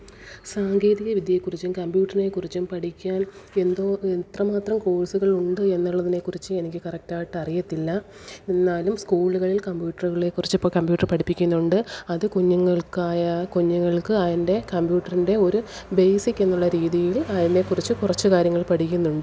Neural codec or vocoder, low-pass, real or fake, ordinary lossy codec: none; none; real; none